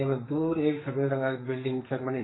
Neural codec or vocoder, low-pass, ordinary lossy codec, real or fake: codec, 44.1 kHz, 2.6 kbps, SNAC; 7.2 kHz; AAC, 16 kbps; fake